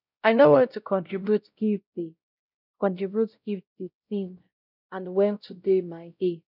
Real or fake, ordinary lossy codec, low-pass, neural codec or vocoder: fake; none; 5.4 kHz; codec, 16 kHz, 0.5 kbps, X-Codec, WavLM features, trained on Multilingual LibriSpeech